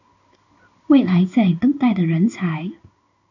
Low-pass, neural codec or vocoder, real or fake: 7.2 kHz; codec, 16 kHz in and 24 kHz out, 1 kbps, XY-Tokenizer; fake